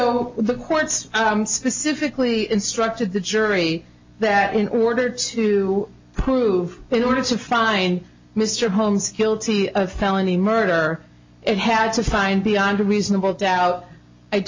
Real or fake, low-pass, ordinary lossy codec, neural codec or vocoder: real; 7.2 kHz; MP3, 48 kbps; none